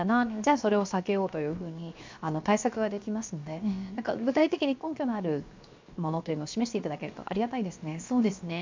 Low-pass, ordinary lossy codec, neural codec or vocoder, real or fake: 7.2 kHz; MP3, 64 kbps; codec, 16 kHz, 0.7 kbps, FocalCodec; fake